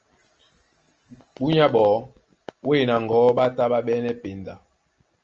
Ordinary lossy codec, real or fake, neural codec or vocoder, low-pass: Opus, 24 kbps; real; none; 7.2 kHz